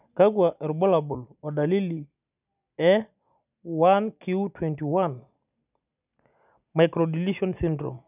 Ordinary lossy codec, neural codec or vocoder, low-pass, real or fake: none; none; 3.6 kHz; real